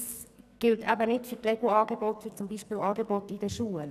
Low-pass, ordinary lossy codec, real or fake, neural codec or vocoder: 14.4 kHz; none; fake; codec, 44.1 kHz, 2.6 kbps, SNAC